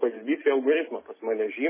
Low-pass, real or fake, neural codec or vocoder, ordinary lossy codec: 3.6 kHz; real; none; MP3, 16 kbps